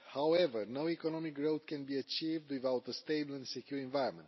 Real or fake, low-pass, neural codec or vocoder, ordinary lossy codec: real; 7.2 kHz; none; MP3, 24 kbps